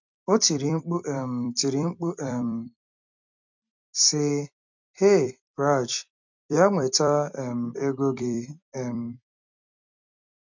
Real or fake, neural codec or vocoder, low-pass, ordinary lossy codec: fake; vocoder, 44.1 kHz, 128 mel bands every 256 samples, BigVGAN v2; 7.2 kHz; MP3, 64 kbps